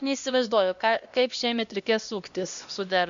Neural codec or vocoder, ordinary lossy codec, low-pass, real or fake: codec, 16 kHz, 1 kbps, X-Codec, HuBERT features, trained on LibriSpeech; Opus, 64 kbps; 7.2 kHz; fake